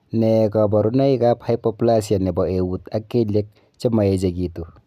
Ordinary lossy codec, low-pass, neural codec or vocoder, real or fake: none; 14.4 kHz; none; real